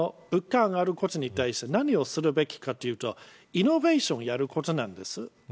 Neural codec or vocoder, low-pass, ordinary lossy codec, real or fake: none; none; none; real